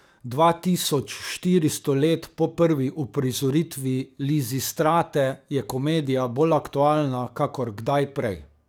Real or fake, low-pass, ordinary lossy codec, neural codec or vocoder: fake; none; none; codec, 44.1 kHz, 7.8 kbps, DAC